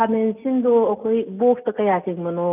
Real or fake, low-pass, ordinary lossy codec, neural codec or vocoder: real; 3.6 kHz; none; none